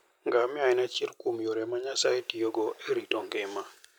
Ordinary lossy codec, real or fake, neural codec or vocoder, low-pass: none; real; none; none